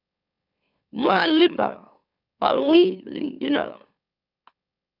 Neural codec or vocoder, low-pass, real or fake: autoencoder, 44.1 kHz, a latent of 192 numbers a frame, MeloTTS; 5.4 kHz; fake